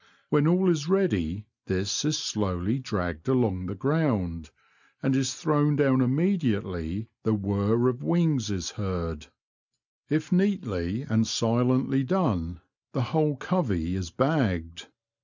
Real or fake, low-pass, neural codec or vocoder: real; 7.2 kHz; none